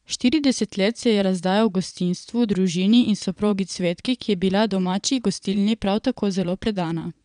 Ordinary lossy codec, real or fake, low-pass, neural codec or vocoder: none; fake; 9.9 kHz; vocoder, 22.05 kHz, 80 mel bands, Vocos